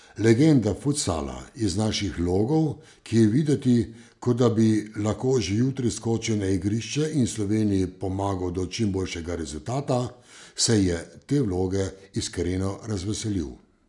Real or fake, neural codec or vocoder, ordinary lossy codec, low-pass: real; none; none; 10.8 kHz